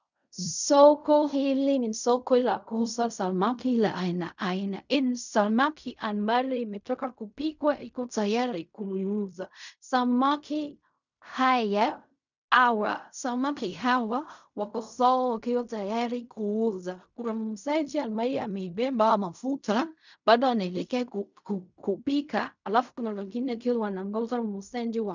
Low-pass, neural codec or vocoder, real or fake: 7.2 kHz; codec, 16 kHz in and 24 kHz out, 0.4 kbps, LongCat-Audio-Codec, fine tuned four codebook decoder; fake